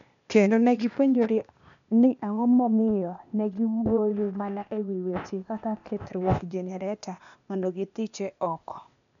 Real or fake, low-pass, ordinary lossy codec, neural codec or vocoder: fake; 7.2 kHz; none; codec, 16 kHz, 0.8 kbps, ZipCodec